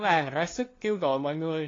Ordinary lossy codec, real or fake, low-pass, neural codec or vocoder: AAC, 32 kbps; fake; 7.2 kHz; codec, 16 kHz, 2 kbps, FunCodec, trained on LibriTTS, 25 frames a second